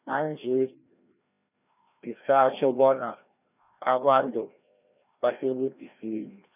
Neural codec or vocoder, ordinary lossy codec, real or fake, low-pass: codec, 16 kHz, 1 kbps, FreqCodec, larger model; none; fake; 3.6 kHz